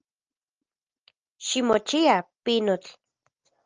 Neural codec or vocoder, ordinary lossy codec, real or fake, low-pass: none; Opus, 24 kbps; real; 7.2 kHz